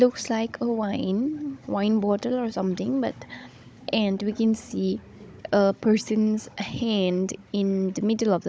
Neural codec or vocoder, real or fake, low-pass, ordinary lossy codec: codec, 16 kHz, 16 kbps, FunCodec, trained on Chinese and English, 50 frames a second; fake; none; none